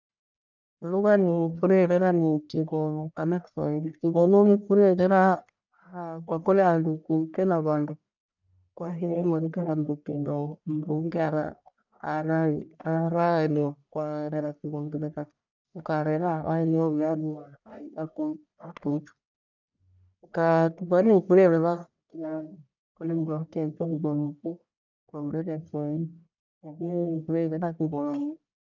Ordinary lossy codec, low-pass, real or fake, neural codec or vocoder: none; 7.2 kHz; fake; codec, 44.1 kHz, 1.7 kbps, Pupu-Codec